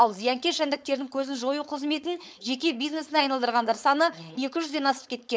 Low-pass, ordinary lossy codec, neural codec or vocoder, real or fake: none; none; codec, 16 kHz, 4.8 kbps, FACodec; fake